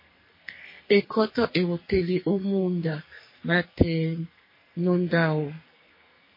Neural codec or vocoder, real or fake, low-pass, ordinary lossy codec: codec, 44.1 kHz, 2.6 kbps, SNAC; fake; 5.4 kHz; MP3, 24 kbps